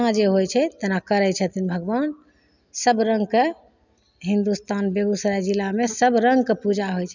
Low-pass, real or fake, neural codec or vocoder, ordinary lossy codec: 7.2 kHz; real; none; none